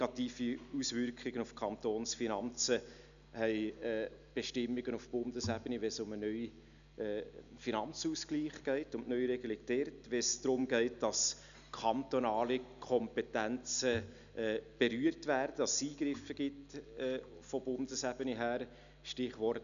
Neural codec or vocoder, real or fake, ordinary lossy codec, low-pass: none; real; none; 7.2 kHz